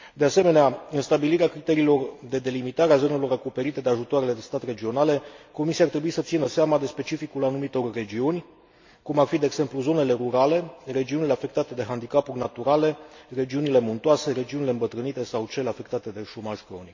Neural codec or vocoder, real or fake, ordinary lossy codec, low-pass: none; real; MP3, 48 kbps; 7.2 kHz